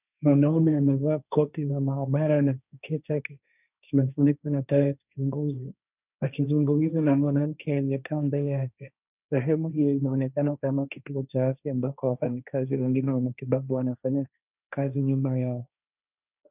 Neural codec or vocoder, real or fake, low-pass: codec, 16 kHz, 1.1 kbps, Voila-Tokenizer; fake; 3.6 kHz